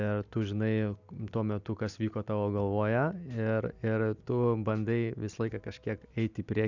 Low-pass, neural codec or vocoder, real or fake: 7.2 kHz; none; real